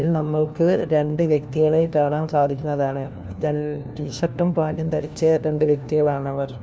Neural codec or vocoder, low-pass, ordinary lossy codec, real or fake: codec, 16 kHz, 1 kbps, FunCodec, trained on LibriTTS, 50 frames a second; none; none; fake